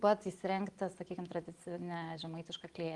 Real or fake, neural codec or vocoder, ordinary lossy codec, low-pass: real; none; Opus, 24 kbps; 10.8 kHz